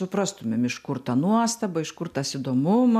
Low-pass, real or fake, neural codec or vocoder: 14.4 kHz; real; none